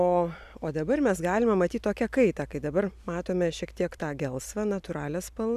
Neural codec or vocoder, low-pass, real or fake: vocoder, 44.1 kHz, 128 mel bands every 256 samples, BigVGAN v2; 14.4 kHz; fake